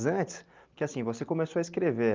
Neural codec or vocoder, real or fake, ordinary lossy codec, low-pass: none; real; Opus, 24 kbps; 7.2 kHz